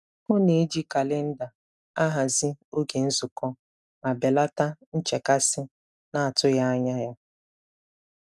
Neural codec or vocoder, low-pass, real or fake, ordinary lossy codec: none; none; real; none